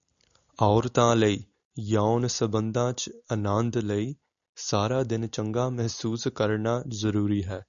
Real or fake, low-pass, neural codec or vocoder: real; 7.2 kHz; none